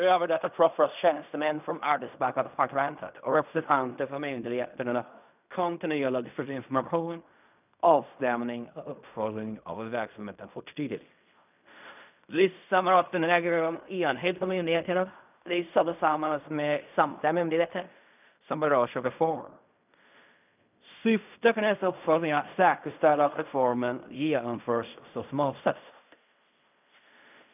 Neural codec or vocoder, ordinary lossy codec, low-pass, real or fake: codec, 16 kHz in and 24 kHz out, 0.4 kbps, LongCat-Audio-Codec, fine tuned four codebook decoder; none; 3.6 kHz; fake